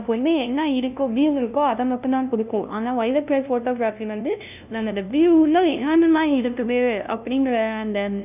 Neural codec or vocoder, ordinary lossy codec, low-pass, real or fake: codec, 16 kHz, 0.5 kbps, FunCodec, trained on LibriTTS, 25 frames a second; none; 3.6 kHz; fake